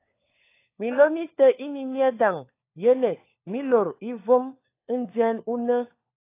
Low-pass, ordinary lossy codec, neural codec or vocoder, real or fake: 3.6 kHz; AAC, 24 kbps; codec, 16 kHz, 4 kbps, FunCodec, trained on LibriTTS, 50 frames a second; fake